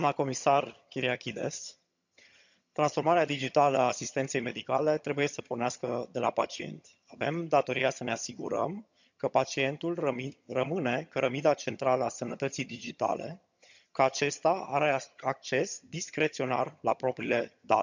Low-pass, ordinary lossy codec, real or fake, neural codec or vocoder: 7.2 kHz; none; fake; vocoder, 22.05 kHz, 80 mel bands, HiFi-GAN